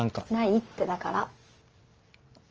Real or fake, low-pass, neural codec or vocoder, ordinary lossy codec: real; 7.2 kHz; none; Opus, 24 kbps